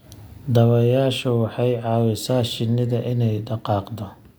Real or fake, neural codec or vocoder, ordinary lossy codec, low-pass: real; none; none; none